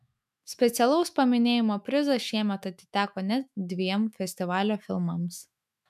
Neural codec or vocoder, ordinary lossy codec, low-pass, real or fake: autoencoder, 48 kHz, 128 numbers a frame, DAC-VAE, trained on Japanese speech; MP3, 96 kbps; 14.4 kHz; fake